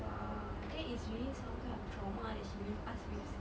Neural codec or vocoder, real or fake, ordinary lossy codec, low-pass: none; real; none; none